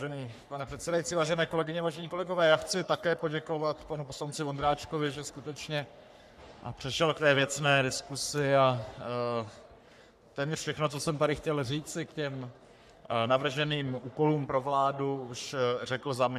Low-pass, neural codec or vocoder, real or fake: 14.4 kHz; codec, 44.1 kHz, 3.4 kbps, Pupu-Codec; fake